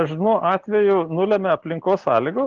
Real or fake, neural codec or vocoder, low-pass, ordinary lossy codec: real; none; 7.2 kHz; Opus, 16 kbps